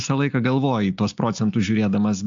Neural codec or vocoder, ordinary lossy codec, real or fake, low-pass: none; AAC, 64 kbps; real; 7.2 kHz